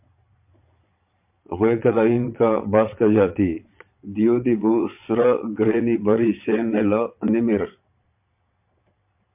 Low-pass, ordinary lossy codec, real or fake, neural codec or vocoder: 3.6 kHz; MP3, 32 kbps; fake; vocoder, 22.05 kHz, 80 mel bands, Vocos